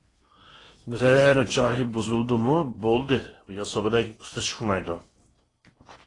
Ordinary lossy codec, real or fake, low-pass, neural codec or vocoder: AAC, 32 kbps; fake; 10.8 kHz; codec, 16 kHz in and 24 kHz out, 0.8 kbps, FocalCodec, streaming, 65536 codes